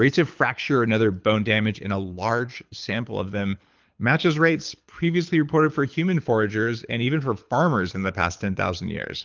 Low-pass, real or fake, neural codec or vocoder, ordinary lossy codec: 7.2 kHz; fake; codec, 24 kHz, 6 kbps, HILCodec; Opus, 24 kbps